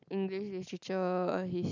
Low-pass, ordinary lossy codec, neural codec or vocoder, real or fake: 7.2 kHz; none; none; real